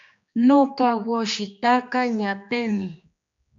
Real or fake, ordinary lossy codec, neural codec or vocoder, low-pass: fake; AAC, 64 kbps; codec, 16 kHz, 2 kbps, X-Codec, HuBERT features, trained on general audio; 7.2 kHz